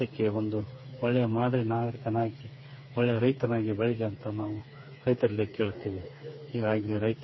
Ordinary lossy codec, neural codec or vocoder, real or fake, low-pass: MP3, 24 kbps; codec, 16 kHz, 4 kbps, FreqCodec, smaller model; fake; 7.2 kHz